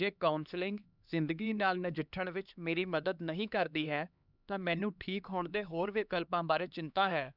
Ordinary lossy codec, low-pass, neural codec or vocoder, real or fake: none; 5.4 kHz; codec, 16 kHz, 2 kbps, X-Codec, HuBERT features, trained on LibriSpeech; fake